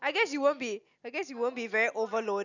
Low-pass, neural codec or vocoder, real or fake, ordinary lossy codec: 7.2 kHz; none; real; none